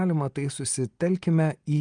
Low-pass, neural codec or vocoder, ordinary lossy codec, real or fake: 9.9 kHz; none; Opus, 32 kbps; real